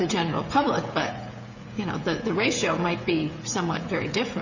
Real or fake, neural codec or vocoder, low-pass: fake; codec, 16 kHz, 16 kbps, FreqCodec, smaller model; 7.2 kHz